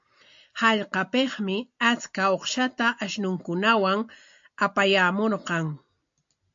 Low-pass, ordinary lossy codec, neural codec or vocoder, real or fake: 7.2 kHz; AAC, 64 kbps; none; real